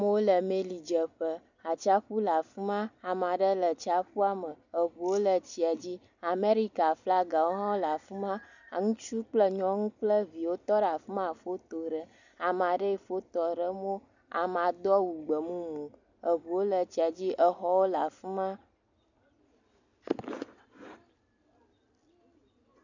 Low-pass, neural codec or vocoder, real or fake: 7.2 kHz; none; real